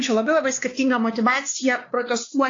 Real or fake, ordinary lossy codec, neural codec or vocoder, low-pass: fake; AAC, 32 kbps; codec, 16 kHz, 2 kbps, X-Codec, WavLM features, trained on Multilingual LibriSpeech; 7.2 kHz